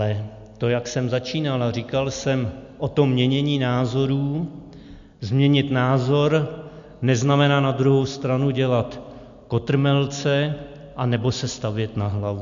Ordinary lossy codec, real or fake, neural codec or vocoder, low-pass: MP3, 64 kbps; real; none; 7.2 kHz